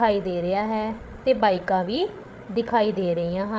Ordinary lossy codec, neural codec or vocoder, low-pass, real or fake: none; codec, 16 kHz, 8 kbps, FreqCodec, larger model; none; fake